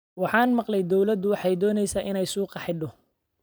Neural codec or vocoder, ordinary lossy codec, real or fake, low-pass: vocoder, 44.1 kHz, 128 mel bands every 256 samples, BigVGAN v2; none; fake; none